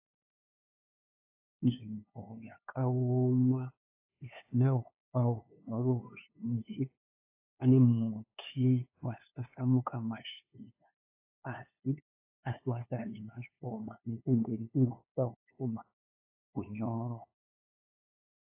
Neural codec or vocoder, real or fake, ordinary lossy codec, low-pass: codec, 16 kHz, 2 kbps, FunCodec, trained on LibriTTS, 25 frames a second; fake; AAC, 24 kbps; 3.6 kHz